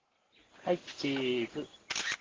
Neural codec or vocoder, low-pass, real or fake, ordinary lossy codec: none; 7.2 kHz; real; Opus, 16 kbps